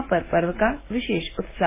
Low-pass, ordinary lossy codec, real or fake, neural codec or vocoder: 3.6 kHz; MP3, 16 kbps; real; none